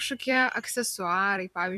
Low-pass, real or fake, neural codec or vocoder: 14.4 kHz; fake; vocoder, 44.1 kHz, 128 mel bands every 256 samples, BigVGAN v2